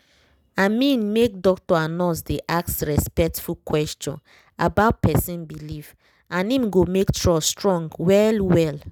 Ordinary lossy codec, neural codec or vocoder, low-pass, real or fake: none; none; 19.8 kHz; real